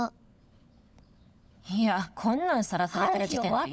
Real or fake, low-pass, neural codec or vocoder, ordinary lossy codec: fake; none; codec, 16 kHz, 16 kbps, FunCodec, trained on LibriTTS, 50 frames a second; none